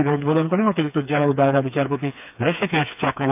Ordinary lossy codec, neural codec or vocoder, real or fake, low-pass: none; codec, 16 kHz, 4 kbps, FreqCodec, smaller model; fake; 3.6 kHz